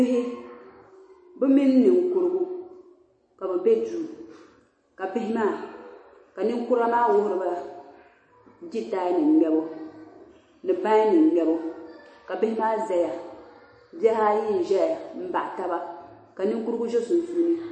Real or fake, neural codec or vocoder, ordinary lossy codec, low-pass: real; none; MP3, 32 kbps; 9.9 kHz